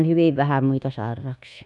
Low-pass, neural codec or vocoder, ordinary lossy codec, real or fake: none; codec, 24 kHz, 1.2 kbps, DualCodec; none; fake